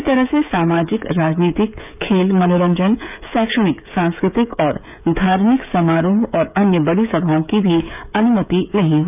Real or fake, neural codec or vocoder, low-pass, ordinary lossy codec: fake; codec, 16 kHz, 16 kbps, FreqCodec, smaller model; 3.6 kHz; none